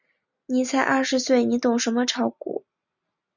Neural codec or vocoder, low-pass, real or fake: none; 7.2 kHz; real